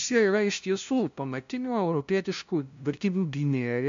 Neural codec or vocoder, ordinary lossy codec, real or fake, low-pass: codec, 16 kHz, 0.5 kbps, FunCodec, trained on LibriTTS, 25 frames a second; MP3, 64 kbps; fake; 7.2 kHz